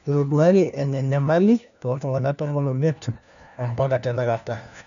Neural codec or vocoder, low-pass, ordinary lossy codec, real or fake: codec, 16 kHz, 1 kbps, FunCodec, trained on LibriTTS, 50 frames a second; 7.2 kHz; none; fake